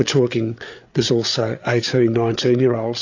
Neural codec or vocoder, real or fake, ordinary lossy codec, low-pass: codec, 16 kHz, 8 kbps, FreqCodec, larger model; fake; AAC, 48 kbps; 7.2 kHz